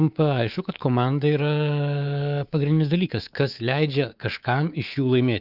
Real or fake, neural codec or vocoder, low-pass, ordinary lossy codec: fake; codec, 24 kHz, 3.1 kbps, DualCodec; 5.4 kHz; Opus, 32 kbps